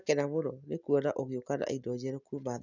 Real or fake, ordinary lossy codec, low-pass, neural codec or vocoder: real; none; 7.2 kHz; none